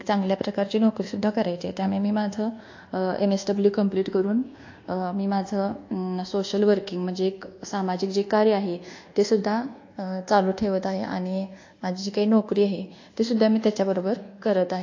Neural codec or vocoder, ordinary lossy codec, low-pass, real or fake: codec, 24 kHz, 1.2 kbps, DualCodec; AAC, 48 kbps; 7.2 kHz; fake